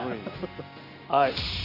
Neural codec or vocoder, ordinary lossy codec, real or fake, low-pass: none; none; real; 5.4 kHz